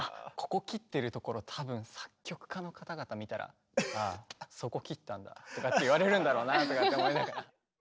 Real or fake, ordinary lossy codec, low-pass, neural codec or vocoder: real; none; none; none